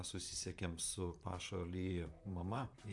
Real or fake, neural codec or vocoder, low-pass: fake; vocoder, 44.1 kHz, 128 mel bands, Pupu-Vocoder; 10.8 kHz